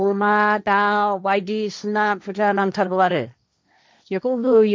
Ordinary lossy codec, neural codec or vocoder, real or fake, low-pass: none; codec, 16 kHz, 1.1 kbps, Voila-Tokenizer; fake; none